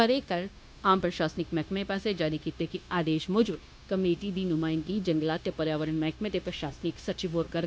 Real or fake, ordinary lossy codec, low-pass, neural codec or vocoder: fake; none; none; codec, 16 kHz, 0.9 kbps, LongCat-Audio-Codec